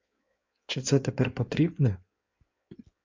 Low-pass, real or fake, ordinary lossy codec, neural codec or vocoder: 7.2 kHz; fake; MP3, 64 kbps; codec, 16 kHz in and 24 kHz out, 1.1 kbps, FireRedTTS-2 codec